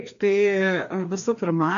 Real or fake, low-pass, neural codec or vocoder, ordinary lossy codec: fake; 7.2 kHz; codec, 16 kHz, 2 kbps, FreqCodec, larger model; MP3, 64 kbps